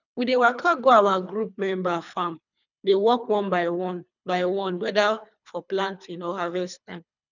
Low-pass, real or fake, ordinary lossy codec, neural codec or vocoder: 7.2 kHz; fake; none; codec, 24 kHz, 3 kbps, HILCodec